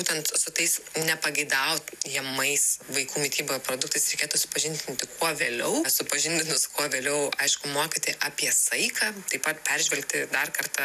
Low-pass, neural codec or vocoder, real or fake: 14.4 kHz; none; real